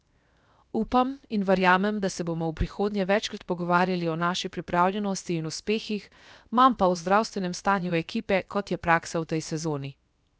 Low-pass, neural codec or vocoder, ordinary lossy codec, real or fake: none; codec, 16 kHz, 0.7 kbps, FocalCodec; none; fake